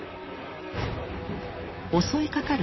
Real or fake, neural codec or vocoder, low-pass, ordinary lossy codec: fake; vocoder, 44.1 kHz, 128 mel bands, Pupu-Vocoder; 7.2 kHz; MP3, 24 kbps